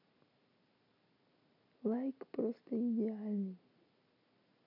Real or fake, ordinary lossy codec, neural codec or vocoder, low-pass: real; none; none; 5.4 kHz